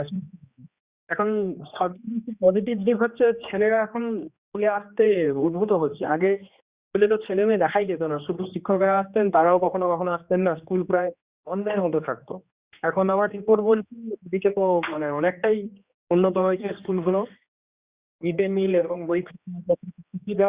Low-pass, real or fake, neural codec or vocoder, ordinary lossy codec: 3.6 kHz; fake; codec, 16 kHz, 2 kbps, X-Codec, HuBERT features, trained on general audio; Opus, 64 kbps